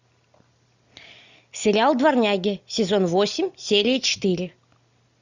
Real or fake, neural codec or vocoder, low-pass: real; none; 7.2 kHz